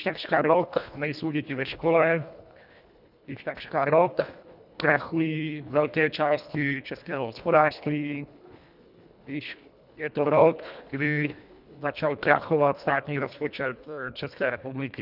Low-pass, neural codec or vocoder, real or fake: 5.4 kHz; codec, 24 kHz, 1.5 kbps, HILCodec; fake